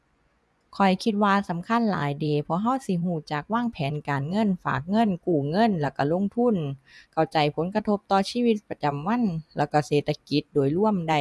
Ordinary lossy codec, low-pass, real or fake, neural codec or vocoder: none; none; fake; vocoder, 24 kHz, 100 mel bands, Vocos